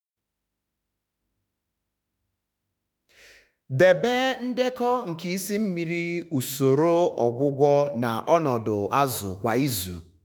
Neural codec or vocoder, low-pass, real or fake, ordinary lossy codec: autoencoder, 48 kHz, 32 numbers a frame, DAC-VAE, trained on Japanese speech; none; fake; none